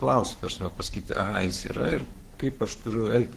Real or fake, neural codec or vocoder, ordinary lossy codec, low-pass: fake; codec, 44.1 kHz, 3.4 kbps, Pupu-Codec; Opus, 16 kbps; 14.4 kHz